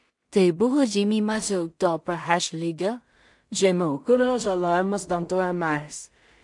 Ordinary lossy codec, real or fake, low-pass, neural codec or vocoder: MP3, 64 kbps; fake; 10.8 kHz; codec, 16 kHz in and 24 kHz out, 0.4 kbps, LongCat-Audio-Codec, two codebook decoder